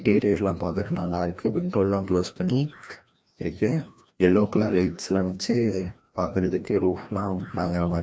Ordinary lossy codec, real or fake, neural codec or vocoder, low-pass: none; fake; codec, 16 kHz, 1 kbps, FreqCodec, larger model; none